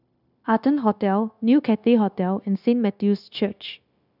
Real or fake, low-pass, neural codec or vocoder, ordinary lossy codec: fake; 5.4 kHz; codec, 16 kHz, 0.9 kbps, LongCat-Audio-Codec; none